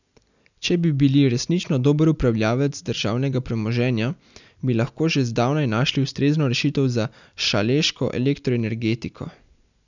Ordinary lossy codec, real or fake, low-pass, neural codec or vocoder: none; real; 7.2 kHz; none